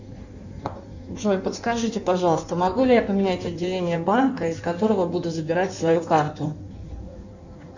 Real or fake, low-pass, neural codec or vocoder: fake; 7.2 kHz; codec, 16 kHz in and 24 kHz out, 1.1 kbps, FireRedTTS-2 codec